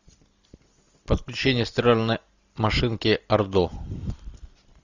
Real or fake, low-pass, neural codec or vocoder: real; 7.2 kHz; none